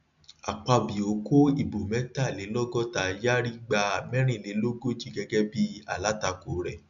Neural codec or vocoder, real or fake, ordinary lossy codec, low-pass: none; real; AAC, 96 kbps; 7.2 kHz